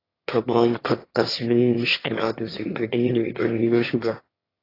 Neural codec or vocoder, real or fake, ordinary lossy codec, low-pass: autoencoder, 22.05 kHz, a latent of 192 numbers a frame, VITS, trained on one speaker; fake; AAC, 24 kbps; 5.4 kHz